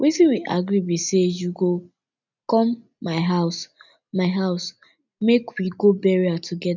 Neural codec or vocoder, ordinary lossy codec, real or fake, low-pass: none; none; real; 7.2 kHz